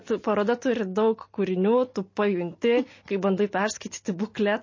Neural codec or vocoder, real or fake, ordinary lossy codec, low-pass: none; real; MP3, 32 kbps; 7.2 kHz